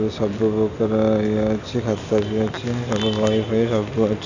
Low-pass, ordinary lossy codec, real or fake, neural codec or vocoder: 7.2 kHz; none; real; none